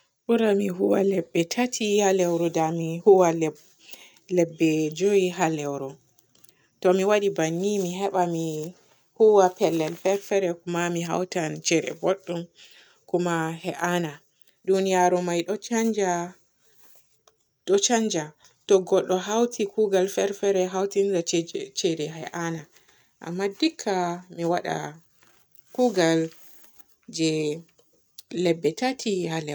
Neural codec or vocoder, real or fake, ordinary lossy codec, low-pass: none; real; none; none